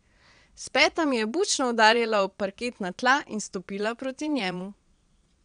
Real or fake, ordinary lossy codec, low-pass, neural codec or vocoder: fake; none; 9.9 kHz; vocoder, 22.05 kHz, 80 mel bands, Vocos